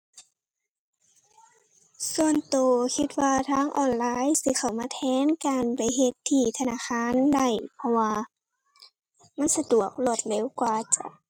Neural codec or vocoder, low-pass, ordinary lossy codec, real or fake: none; 19.8 kHz; MP3, 96 kbps; real